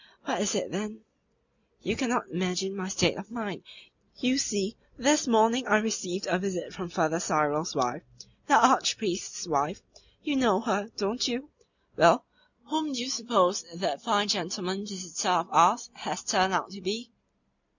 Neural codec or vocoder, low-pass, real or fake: none; 7.2 kHz; real